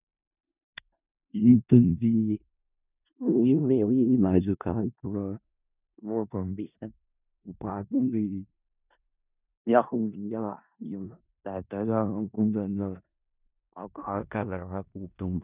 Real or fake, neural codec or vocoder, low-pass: fake; codec, 16 kHz in and 24 kHz out, 0.4 kbps, LongCat-Audio-Codec, four codebook decoder; 3.6 kHz